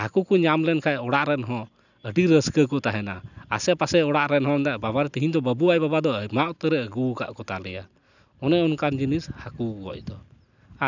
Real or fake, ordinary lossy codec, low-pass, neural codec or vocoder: real; none; 7.2 kHz; none